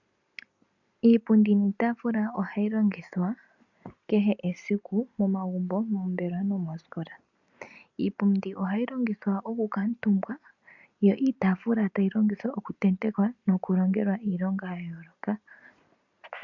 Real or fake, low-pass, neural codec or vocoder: real; 7.2 kHz; none